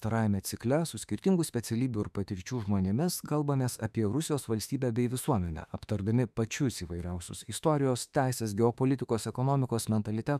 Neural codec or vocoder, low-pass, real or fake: autoencoder, 48 kHz, 32 numbers a frame, DAC-VAE, trained on Japanese speech; 14.4 kHz; fake